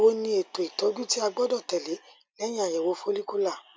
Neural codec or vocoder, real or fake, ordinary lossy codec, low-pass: none; real; none; none